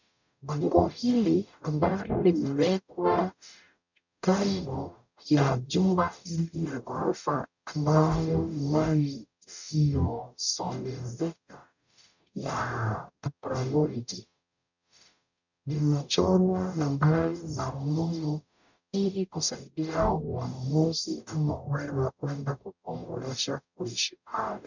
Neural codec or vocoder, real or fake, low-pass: codec, 44.1 kHz, 0.9 kbps, DAC; fake; 7.2 kHz